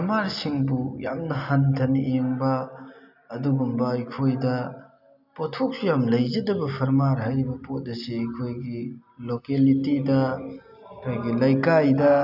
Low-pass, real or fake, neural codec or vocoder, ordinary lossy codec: 5.4 kHz; real; none; none